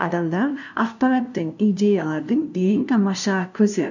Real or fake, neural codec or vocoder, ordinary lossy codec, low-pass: fake; codec, 16 kHz, 0.5 kbps, FunCodec, trained on LibriTTS, 25 frames a second; none; 7.2 kHz